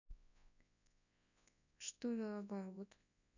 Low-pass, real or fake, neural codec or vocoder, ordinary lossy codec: 7.2 kHz; fake; codec, 24 kHz, 0.9 kbps, WavTokenizer, large speech release; none